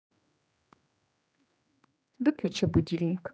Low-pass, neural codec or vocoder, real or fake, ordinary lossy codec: none; codec, 16 kHz, 2 kbps, X-Codec, HuBERT features, trained on general audio; fake; none